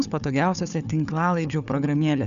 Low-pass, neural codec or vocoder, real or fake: 7.2 kHz; codec, 16 kHz, 4 kbps, FunCodec, trained on Chinese and English, 50 frames a second; fake